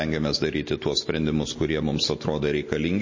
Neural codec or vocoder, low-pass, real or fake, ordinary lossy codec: none; 7.2 kHz; real; MP3, 32 kbps